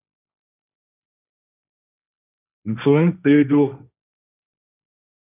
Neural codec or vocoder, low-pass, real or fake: codec, 16 kHz, 1.1 kbps, Voila-Tokenizer; 3.6 kHz; fake